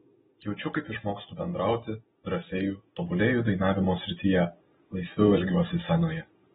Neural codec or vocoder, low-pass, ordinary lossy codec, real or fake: none; 19.8 kHz; AAC, 16 kbps; real